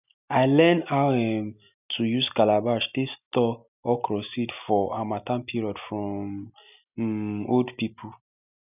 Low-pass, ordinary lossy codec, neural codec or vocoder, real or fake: 3.6 kHz; none; none; real